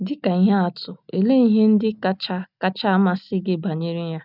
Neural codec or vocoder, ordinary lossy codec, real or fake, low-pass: none; none; real; 5.4 kHz